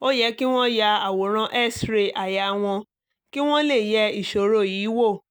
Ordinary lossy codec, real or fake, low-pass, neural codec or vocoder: none; real; none; none